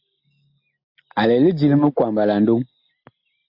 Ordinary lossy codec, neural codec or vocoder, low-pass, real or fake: AAC, 48 kbps; none; 5.4 kHz; real